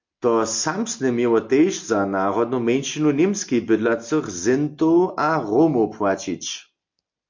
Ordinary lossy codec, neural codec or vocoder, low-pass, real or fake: MP3, 48 kbps; none; 7.2 kHz; real